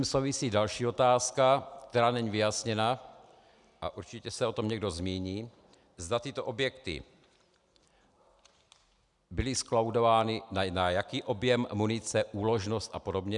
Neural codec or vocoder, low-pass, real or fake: none; 10.8 kHz; real